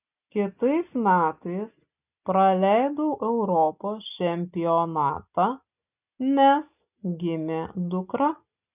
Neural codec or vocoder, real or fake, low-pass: none; real; 3.6 kHz